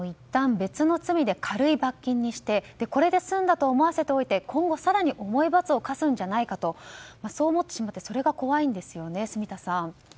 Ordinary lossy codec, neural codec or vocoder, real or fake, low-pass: none; none; real; none